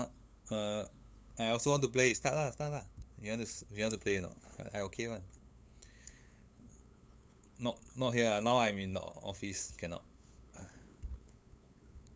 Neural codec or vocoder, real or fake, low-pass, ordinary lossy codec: codec, 16 kHz, 8 kbps, FunCodec, trained on LibriTTS, 25 frames a second; fake; none; none